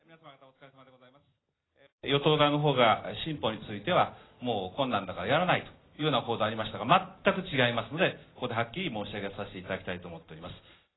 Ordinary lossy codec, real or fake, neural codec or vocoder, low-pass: AAC, 16 kbps; real; none; 7.2 kHz